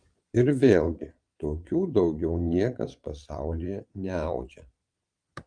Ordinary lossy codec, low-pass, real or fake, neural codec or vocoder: Opus, 32 kbps; 9.9 kHz; fake; vocoder, 22.05 kHz, 80 mel bands, Vocos